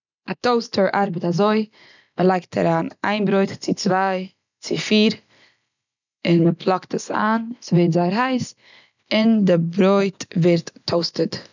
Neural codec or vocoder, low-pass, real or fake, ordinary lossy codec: none; 7.2 kHz; real; none